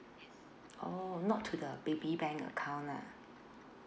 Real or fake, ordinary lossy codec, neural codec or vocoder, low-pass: real; none; none; none